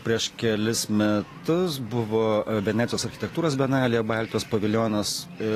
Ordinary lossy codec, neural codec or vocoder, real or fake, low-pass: AAC, 48 kbps; none; real; 14.4 kHz